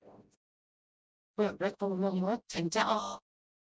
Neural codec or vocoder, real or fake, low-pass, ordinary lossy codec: codec, 16 kHz, 0.5 kbps, FreqCodec, smaller model; fake; none; none